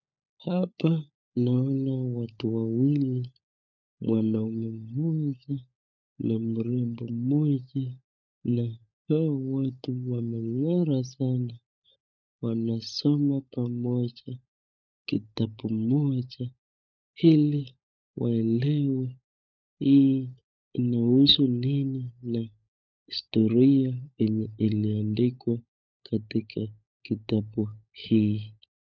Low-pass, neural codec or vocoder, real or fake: 7.2 kHz; codec, 16 kHz, 16 kbps, FunCodec, trained on LibriTTS, 50 frames a second; fake